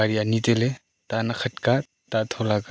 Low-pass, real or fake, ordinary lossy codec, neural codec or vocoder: none; real; none; none